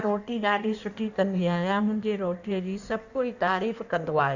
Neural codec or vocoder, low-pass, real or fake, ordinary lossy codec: codec, 16 kHz in and 24 kHz out, 1.1 kbps, FireRedTTS-2 codec; 7.2 kHz; fake; none